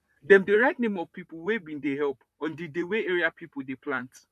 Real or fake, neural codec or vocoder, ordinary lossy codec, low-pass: fake; vocoder, 44.1 kHz, 128 mel bands, Pupu-Vocoder; none; 14.4 kHz